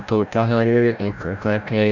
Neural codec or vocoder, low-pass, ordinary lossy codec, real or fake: codec, 16 kHz, 0.5 kbps, FreqCodec, larger model; 7.2 kHz; none; fake